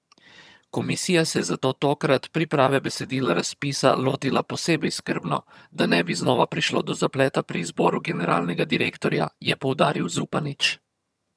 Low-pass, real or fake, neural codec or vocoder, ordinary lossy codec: none; fake; vocoder, 22.05 kHz, 80 mel bands, HiFi-GAN; none